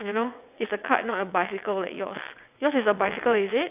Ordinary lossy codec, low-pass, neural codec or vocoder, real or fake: AAC, 32 kbps; 3.6 kHz; vocoder, 22.05 kHz, 80 mel bands, WaveNeXt; fake